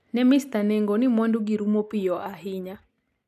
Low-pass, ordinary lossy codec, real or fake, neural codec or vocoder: 14.4 kHz; none; real; none